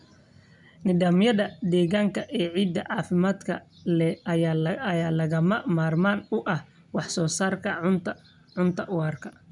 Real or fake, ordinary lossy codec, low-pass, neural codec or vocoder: real; none; 10.8 kHz; none